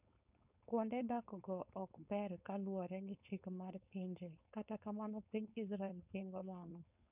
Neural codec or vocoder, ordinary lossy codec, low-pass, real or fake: codec, 16 kHz, 4.8 kbps, FACodec; none; 3.6 kHz; fake